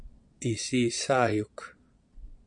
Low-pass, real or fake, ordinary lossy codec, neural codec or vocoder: 9.9 kHz; fake; MP3, 64 kbps; vocoder, 22.05 kHz, 80 mel bands, Vocos